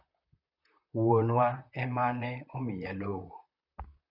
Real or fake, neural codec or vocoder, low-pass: fake; vocoder, 44.1 kHz, 128 mel bands, Pupu-Vocoder; 5.4 kHz